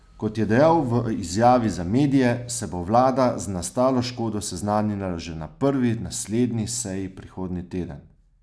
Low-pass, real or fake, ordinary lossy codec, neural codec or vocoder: none; real; none; none